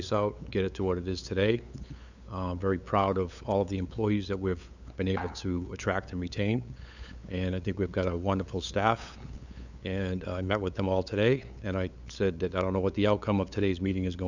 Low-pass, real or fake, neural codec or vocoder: 7.2 kHz; fake; codec, 16 kHz, 8 kbps, FunCodec, trained on LibriTTS, 25 frames a second